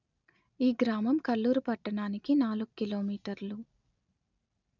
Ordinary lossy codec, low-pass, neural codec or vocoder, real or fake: none; 7.2 kHz; none; real